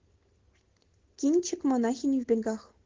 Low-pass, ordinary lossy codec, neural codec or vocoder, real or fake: 7.2 kHz; Opus, 16 kbps; none; real